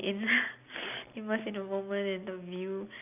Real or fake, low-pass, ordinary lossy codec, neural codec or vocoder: real; 3.6 kHz; none; none